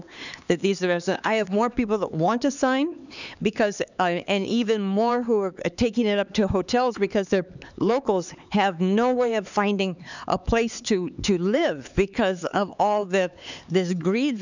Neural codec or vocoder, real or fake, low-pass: codec, 16 kHz, 4 kbps, X-Codec, HuBERT features, trained on LibriSpeech; fake; 7.2 kHz